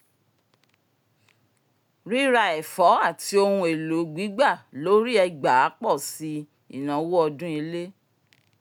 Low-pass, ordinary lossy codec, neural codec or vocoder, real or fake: none; none; none; real